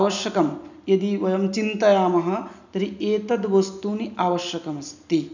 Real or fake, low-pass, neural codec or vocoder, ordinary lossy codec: real; 7.2 kHz; none; none